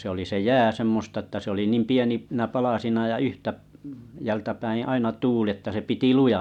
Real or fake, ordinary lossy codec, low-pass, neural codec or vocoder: real; none; 19.8 kHz; none